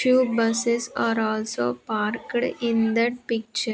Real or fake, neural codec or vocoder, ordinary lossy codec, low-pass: real; none; none; none